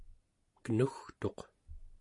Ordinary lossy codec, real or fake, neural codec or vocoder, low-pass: MP3, 48 kbps; real; none; 10.8 kHz